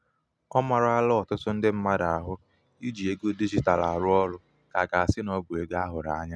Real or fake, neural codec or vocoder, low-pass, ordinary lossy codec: real; none; none; none